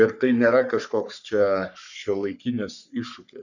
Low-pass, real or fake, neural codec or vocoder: 7.2 kHz; fake; codec, 44.1 kHz, 3.4 kbps, Pupu-Codec